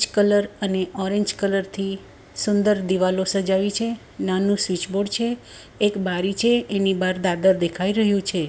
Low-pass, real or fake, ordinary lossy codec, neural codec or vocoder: none; real; none; none